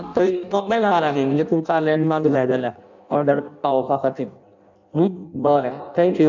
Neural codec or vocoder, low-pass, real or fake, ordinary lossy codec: codec, 16 kHz in and 24 kHz out, 0.6 kbps, FireRedTTS-2 codec; 7.2 kHz; fake; none